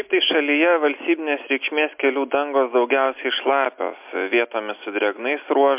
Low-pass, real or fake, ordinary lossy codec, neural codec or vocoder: 3.6 kHz; real; MP3, 32 kbps; none